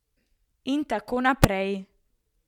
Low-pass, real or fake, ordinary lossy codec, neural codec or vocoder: 19.8 kHz; fake; MP3, 96 kbps; vocoder, 44.1 kHz, 128 mel bands every 512 samples, BigVGAN v2